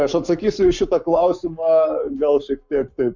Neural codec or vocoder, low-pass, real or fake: codec, 44.1 kHz, 7.8 kbps, Pupu-Codec; 7.2 kHz; fake